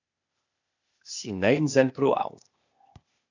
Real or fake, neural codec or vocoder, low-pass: fake; codec, 16 kHz, 0.8 kbps, ZipCodec; 7.2 kHz